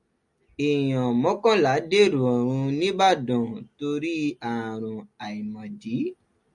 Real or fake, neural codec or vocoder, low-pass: real; none; 10.8 kHz